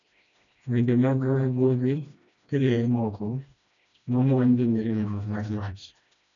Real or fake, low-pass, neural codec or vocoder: fake; 7.2 kHz; codec, 16 kHz, 1 kbps, FreqCodec, smaller model